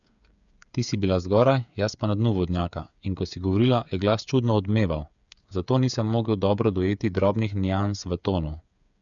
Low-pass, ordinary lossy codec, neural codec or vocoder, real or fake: 7.2 kHz; none; codec, 16 kHz, 8 kbps, FreqCodec, smaller model; fake